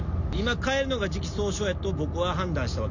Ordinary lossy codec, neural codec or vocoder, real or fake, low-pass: none; none; real; 7.2 kHz